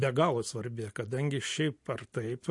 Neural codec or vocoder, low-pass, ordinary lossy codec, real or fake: none; 10.8 kHz; MP3, 48 kbps; real